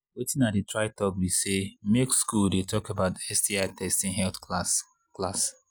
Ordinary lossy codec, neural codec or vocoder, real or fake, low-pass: none; none; real; none